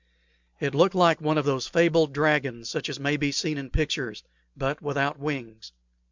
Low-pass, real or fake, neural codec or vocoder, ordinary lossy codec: 7.2 kHz; real; none; MP3, 64 kbps